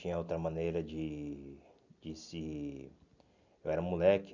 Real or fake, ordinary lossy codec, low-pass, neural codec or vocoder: real; none; 7.2 kHz; none